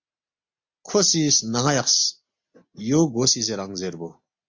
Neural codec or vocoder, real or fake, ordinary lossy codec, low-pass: none; real; MP3, 48 kbps; 7.2 kHz